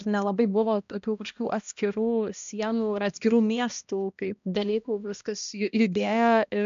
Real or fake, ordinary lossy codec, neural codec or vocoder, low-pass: fake; MP3, 64 kbps; codec, 16 kHz, 1 kbps, X-Codec, HuBERT features, trained on balanced general audio; 7.2 kHz